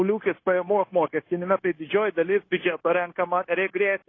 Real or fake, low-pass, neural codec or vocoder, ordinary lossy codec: fake; 7.2 kHz; codec, 16 kHz, 0.9 kbps, LongCat-Audio-Codec; AAC, 32 kbps